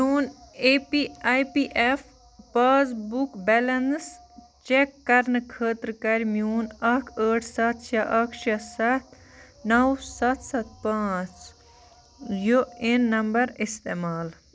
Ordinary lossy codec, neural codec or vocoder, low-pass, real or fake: none; none; none; real